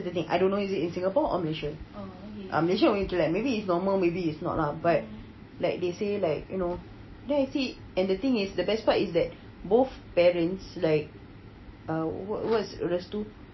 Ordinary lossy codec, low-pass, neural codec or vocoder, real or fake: MP3, 24 kbps; 7.2 kHz; none; real